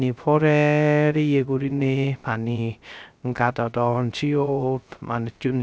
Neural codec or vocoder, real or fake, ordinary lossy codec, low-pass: codec, 16 kHz, 0.3 kbps, FocalCodec; fake; none; none